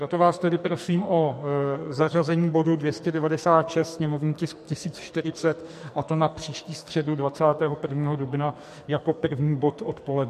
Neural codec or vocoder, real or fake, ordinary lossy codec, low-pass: codec, 44.1 kHz, 2.6 kbps, SNAC; fake; MP3, 64 kbps; 14.4 kHz